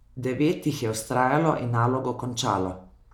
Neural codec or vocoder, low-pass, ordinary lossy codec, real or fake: none; 19.8 kHz; none; real